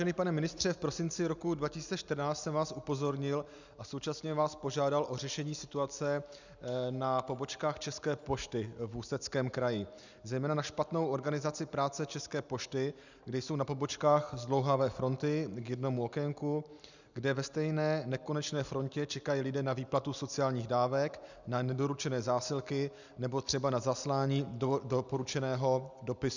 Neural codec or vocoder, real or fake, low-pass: none; real; 7.2 kHz